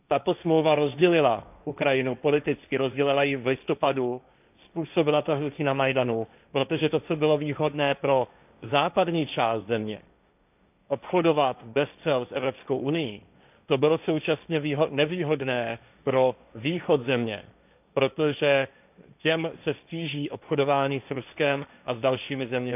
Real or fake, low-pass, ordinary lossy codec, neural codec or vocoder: fake; 3.6 kHz; none; codec, 16 kHz, 1.1 kbps, Voila-Tokenizer